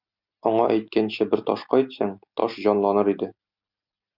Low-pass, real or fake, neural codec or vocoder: 5.4 kHz; real; none